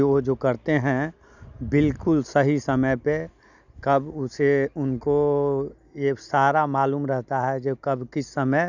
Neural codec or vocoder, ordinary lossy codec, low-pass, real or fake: none; none; 7.2 kHz; real